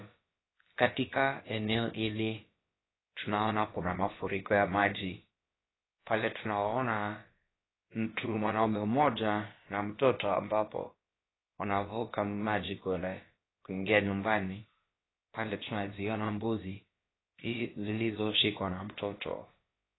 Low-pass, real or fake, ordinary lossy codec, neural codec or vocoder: 7.2 kHz; fake; AAC, 16 kbps; codec, 16 kHz, about 1 kbps, DyCAST, with the encoder's durations